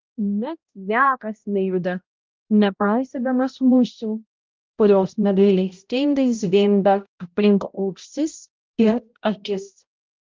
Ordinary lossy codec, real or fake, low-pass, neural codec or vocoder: Opus, 24 kbps; fake; 7.2 kHz; codec, 16 kHz, 0.5 kbps, X-Codec, HuBERT features, trained on balanced general audio